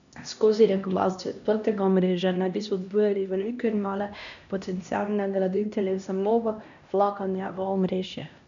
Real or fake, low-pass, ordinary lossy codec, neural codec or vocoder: fake; 7.2 kHz; none; codec, 16 kHz, 1 kbps, X-Codec, HuBERT features, trained on LibriSpeech